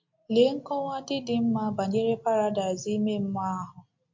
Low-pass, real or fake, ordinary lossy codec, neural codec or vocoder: 7.2 kHz; real; MP3, 48 kbps; none